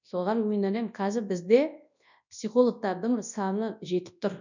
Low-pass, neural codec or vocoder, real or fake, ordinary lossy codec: 7.2 kHz; codec, 24 kHz, 0.9 kbps, WavTokenizer, large speech release; fake; none